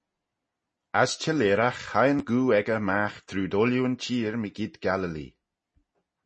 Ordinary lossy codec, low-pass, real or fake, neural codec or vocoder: MP3, 32 kbps; 10.8 kHz; real; none